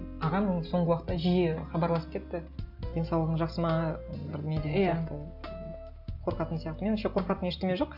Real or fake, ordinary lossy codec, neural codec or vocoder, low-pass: real; none; none; 5.4 kHz